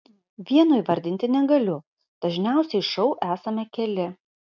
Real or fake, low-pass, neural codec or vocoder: real; 7.2 kHz; none